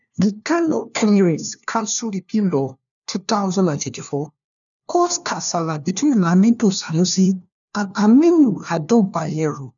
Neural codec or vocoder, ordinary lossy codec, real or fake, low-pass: codec, 16 kHz, 1 kbps, FunCodec, trained on LibriTTS, 50 frames a second; MP3, 96 kbps; fake; 7.2 kHz